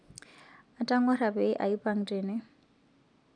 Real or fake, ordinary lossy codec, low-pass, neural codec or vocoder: real; none; 9.9 kHz; none